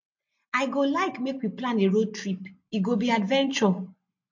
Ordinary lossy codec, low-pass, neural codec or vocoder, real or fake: MP3, 48 kbps; 7.2 kHz; none; real